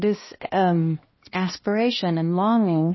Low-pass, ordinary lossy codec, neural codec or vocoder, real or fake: 7.2 kHz; MP3, 24 kbps; codec, 16 kHz, 1 kbps, X-Codec, HuBERT features, trained on balanced general audio; fake